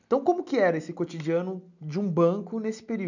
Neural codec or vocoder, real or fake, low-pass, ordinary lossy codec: none; real; 7.2 kHz; none